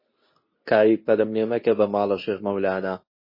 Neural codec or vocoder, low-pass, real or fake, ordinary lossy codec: codec, 24 kHz, 0.9 kbps, WavTokenizer, medium speech release version 2; 5.4 kHz; fake; MP3, 24 kbps